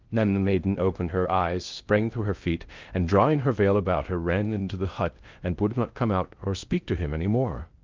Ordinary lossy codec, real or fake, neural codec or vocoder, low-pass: Opus, 24 kbps; fake; codec, 16 kHz in and 24 kHz out, 0.6 kbps, FocalCodec, streaming, 4096 codes; 7.2 kHz